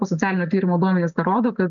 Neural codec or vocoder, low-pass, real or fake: none; 7.2 kHz; real